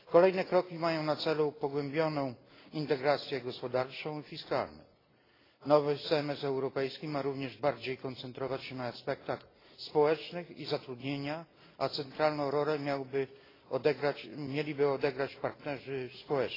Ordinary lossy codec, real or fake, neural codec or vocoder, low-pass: AAC, 24 kbps; real; none; 5.4 kHz